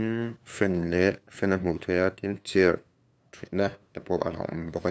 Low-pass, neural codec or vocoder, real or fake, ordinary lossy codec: none; codec, 16 kHz, 2 kbps, FunCodec, trained on LibriTTS, 25 frames a second; fake; none